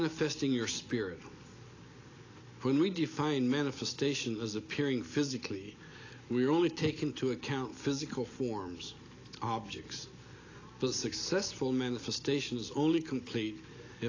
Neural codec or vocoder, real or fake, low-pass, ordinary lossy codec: none; real; 7.2 kHz; AAC, 32 kbps